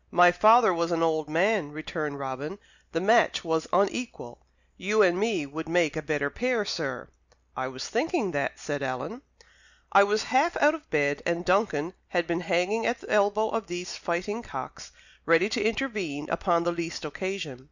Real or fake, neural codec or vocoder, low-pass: real; none; 7.2 kHz